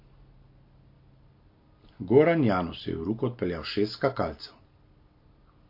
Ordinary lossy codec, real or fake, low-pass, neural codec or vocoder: MP3, 32 kbps; real; 5.4 kHz; none